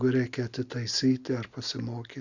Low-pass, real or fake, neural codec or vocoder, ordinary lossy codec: 7.2 kHz; real; none; Opus, 64 kbps